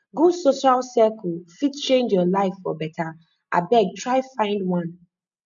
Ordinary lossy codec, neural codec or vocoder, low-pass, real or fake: none; none; 7.2 kHz; real